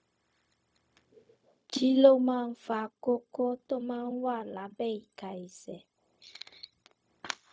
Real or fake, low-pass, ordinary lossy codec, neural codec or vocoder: fake; none; none; codec, 16 kHz, 0.4 kbps, LongCat-Audio-Codec